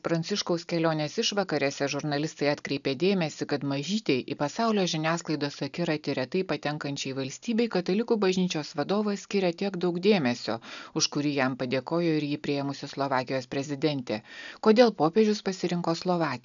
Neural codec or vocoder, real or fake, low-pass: none; real; 7.2 kHz